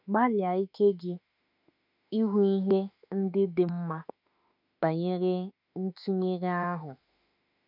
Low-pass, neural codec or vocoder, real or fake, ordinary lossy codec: 5.4 kHz; autoencoder, 48 kHz, 32 numbers a frame, DAC-VAE, trained on Japanese speech; fake; none